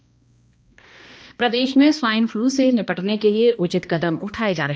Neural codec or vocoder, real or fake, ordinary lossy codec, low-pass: codec, 16 kHz, 1 kbps, X-Codec, HuBERT features, trained on balanced general audio; fake; none; none